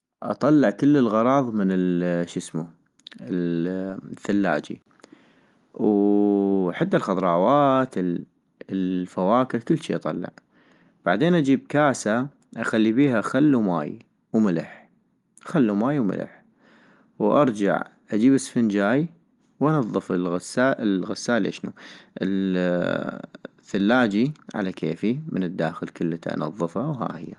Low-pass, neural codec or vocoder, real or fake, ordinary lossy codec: 10.8 kHz; none; real; Opus, 32 kbps